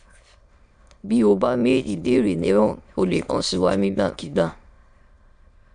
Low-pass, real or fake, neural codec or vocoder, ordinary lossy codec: 9.9 kHz; fake; autoencoder, 22.05 kHz, a latent of 192 numbers a frame, VITS, trained on many speakers; none